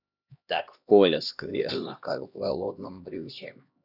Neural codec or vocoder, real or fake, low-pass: codec, 16 kHz, 1 kbps, X-Codec, HuBERT features, trained on LibriSpeech; fake; 5.4 kHz